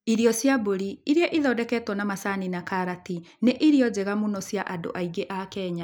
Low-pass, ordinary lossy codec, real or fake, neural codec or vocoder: 19.8 kHz; none; real; none